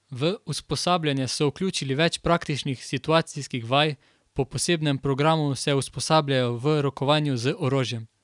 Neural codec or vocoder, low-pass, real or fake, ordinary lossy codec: none; 10.8 kHz; real; none